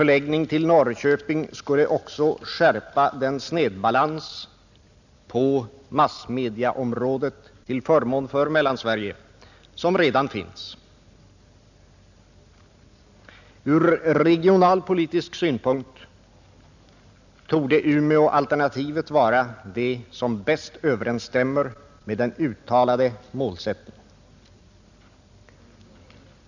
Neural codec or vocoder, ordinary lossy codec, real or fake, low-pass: none; none; real; 7.2 kHz